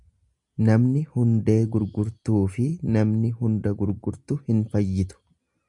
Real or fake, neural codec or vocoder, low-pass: real; none; 10.8 kHz